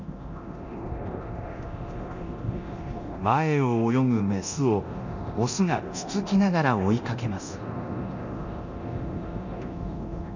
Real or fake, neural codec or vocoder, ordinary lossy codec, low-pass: fake; codec, 24 kHz, 0.9 kbps, DualCodec; none; 7.2 kHz